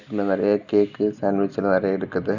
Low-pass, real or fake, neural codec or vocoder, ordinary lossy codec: 7.2 kHz; real; none; none